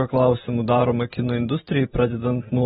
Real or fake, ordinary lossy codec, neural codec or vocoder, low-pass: real; AAC, 16 kbps; none; 19.8 kHz